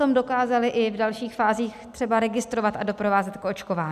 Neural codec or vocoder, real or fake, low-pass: none; real; 14.4 kHz